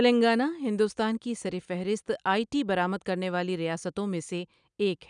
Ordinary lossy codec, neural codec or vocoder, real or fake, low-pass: none; none; real; 9.9 kHz